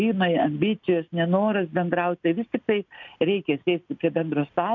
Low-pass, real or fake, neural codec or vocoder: 7.2 kHz; real; none